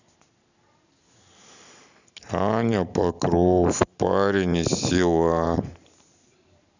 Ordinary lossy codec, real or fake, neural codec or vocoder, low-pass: none; fake; vocoder, 44.1 kHz, 128 mel bands every 512 samples, BigVGAN v2; 7.2 kHz